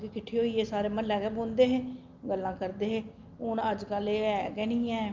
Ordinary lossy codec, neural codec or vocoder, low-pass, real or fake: Opus, 24 kbps; none; 7.2 kHz; real